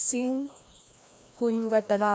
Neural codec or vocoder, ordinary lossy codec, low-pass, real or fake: codec, 16 kHz, 1 kbps, FreqCodec, larger model; none; none; fake